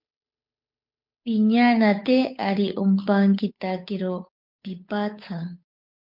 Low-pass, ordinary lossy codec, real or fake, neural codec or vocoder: 5.4 kHz; MP3, 48 kbps; fake; codec, 16 kHz, 8 kbps, FunCodec, trained on Chinese and English, 25 frames a second